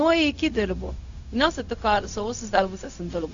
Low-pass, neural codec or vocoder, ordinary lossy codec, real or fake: 7.2 kHz; codec, 16 kHz, 0.4 kbps, LongCat-Audio-Codec; AAC, 64 kbps; fake